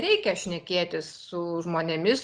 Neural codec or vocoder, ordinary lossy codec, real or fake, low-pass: none; Opus, 24 kbps; real; 9.9 kHz